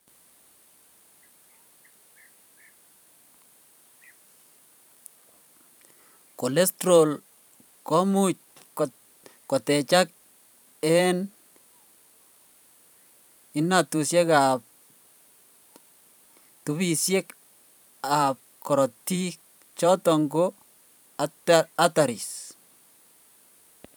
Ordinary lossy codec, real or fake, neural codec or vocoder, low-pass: none; fake; vocoder, 44.1 kHz, 128 mel bands every 512 samples, BigVGAN v2; none